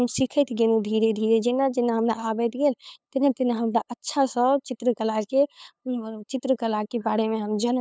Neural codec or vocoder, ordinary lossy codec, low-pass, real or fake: codec, 16 kHz, 8 kbps, FunCodec, trained on LibriTTS, 25 frames a second; none; none; fake